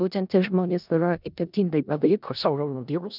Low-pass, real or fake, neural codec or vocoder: 5.4 kHz; fake; codec, 16 kHz in and 24 kHz out, 0.4 kbps, LongCat-Audio-Codec, four codebook decoder